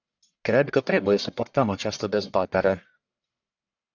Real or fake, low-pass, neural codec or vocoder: fake; 7.2 kHz; codec, 44.1 kHz, 1.7 kbps, Pupu-Codec